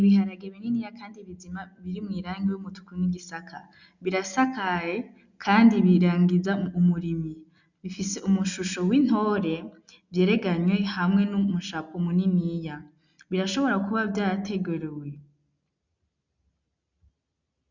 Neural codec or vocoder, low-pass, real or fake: none; 7.2 kHz; real